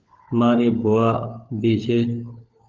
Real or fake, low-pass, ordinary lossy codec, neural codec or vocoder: fake; 7.2 kHz; Opus, 24 kbps; codec, 16 kHz, 16 kbps, FunCodec, trained on LibriTTS, 50 frames a second